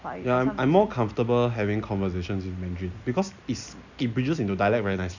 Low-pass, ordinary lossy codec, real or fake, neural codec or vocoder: 7.2 kHz; none; real; none